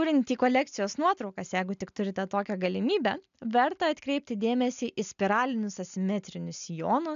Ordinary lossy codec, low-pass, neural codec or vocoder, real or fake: AAC, 96 kbps; 7.2 kHz; none; real